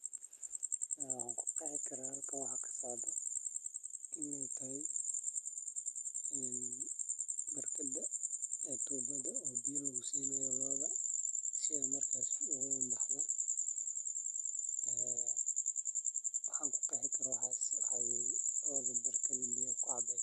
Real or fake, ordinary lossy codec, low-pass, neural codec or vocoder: real; Opus, 32 kbps; 14.4 kHz; none